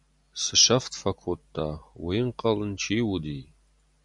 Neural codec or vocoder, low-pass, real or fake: none; 10.8 kHz; real